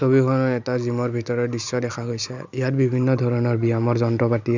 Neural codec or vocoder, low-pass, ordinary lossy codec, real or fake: none; 7.2 kHz; Opus, 64 kbps; real